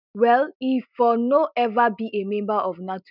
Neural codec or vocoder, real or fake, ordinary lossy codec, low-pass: none; real; none; 5.4 kHz